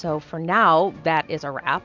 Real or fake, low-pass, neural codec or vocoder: real; 7.2 kHz; none